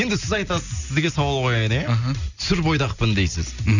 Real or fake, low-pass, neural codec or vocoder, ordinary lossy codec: real; 7.2 kHz; none; none